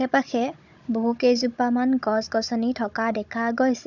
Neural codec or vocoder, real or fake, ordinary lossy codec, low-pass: codec, 16 kHz, 16 kbps, FunCodec, trained on Chinese and English, 50 frames a second; fake; none; 7.2 kHz